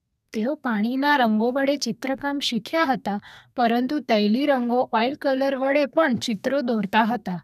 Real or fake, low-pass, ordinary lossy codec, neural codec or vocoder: fake; 14.4 kHz; none; codec, 32 kHz, 1.9 kbps, SNAC